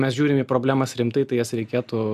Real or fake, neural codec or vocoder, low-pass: real; none; 14.4 kHz